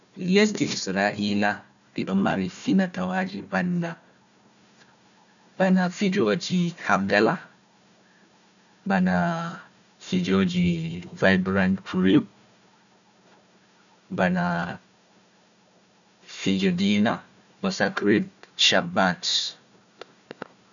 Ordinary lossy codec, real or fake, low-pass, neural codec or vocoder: none; fake; 7.2 kHz; codec, 16 kHz, 1 kbps, FunCodec, trained on Chinese and English, 50 frames a second